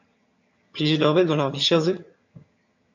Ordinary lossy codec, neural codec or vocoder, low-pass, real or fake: MP3, 48 kbps; vocoder, 22.05 kHz, 80 mel bands, HiFi-GAN; 7.2 kHz; fake